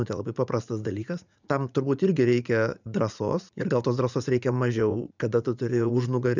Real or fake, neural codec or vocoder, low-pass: fake; vocoder, 44.1 kHz, 80 mel bands, Vocos; 7.2 kHz